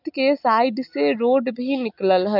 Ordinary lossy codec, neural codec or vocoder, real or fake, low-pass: none; none; real; 5.4 kHz